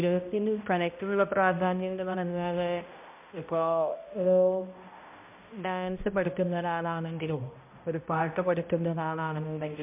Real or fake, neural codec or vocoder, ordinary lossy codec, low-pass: fake; codec, 16 kHz, 0.5 kbps, X-Codec, HuBERT features, trained on balanced general audio; MP3, 32 kbps; 3.6 kHz